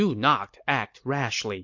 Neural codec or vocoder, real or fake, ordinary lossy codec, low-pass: none; real; MP3, 48 kbps; 7.2 kHz